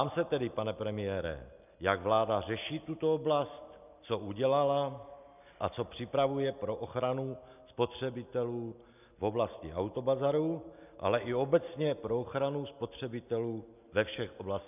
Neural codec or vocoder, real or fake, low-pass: none; real; 3.6 kHz